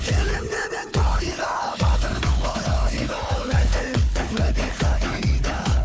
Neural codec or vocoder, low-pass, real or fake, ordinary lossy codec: codec, 16 kHz, 4 kbps, FunCodec, trained on Chinese and English, 50 frames a second; none; fake; none